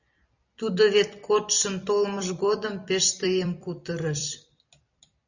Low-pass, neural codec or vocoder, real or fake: 7.2 kHz; vocoder, 44.1 kHz, 128 mel bands every 256 samples, BigVGAN v2; fake